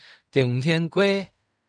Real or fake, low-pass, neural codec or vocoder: fake; 9.9 kHz; codec, 16 kHz in and 24 kHz out, 0.4 kbps, LongCat-Audio-Codec, fine tuned four codebook decoder